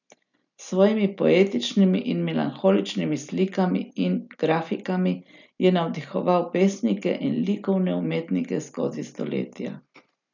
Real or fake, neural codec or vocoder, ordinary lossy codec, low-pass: real; none; none; 7.2 kHz